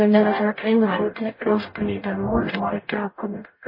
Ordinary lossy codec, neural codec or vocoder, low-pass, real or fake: MP3, 24 kbps; codec, 44.1 kHz, 0.9 kbps, DAC; 5.4 kHz; fake